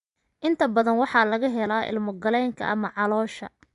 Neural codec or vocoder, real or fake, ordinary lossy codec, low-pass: vocoder, 24 kHz, 100 mel bands, Vocos; fake; none; 10.8 kHz